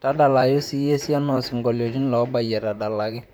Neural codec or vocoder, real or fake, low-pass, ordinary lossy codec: vocoder, 44.1 kHz, 128 mel bands, Pupu-Vocoder; fake; none; none